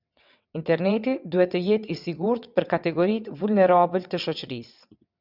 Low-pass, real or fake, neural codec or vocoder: 5.4 kHz; fake; vocoder, 22.05 kHz, 80 mel bands, WaveNeXt